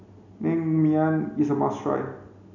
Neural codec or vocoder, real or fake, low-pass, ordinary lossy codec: none; real; 7.2 kHz; none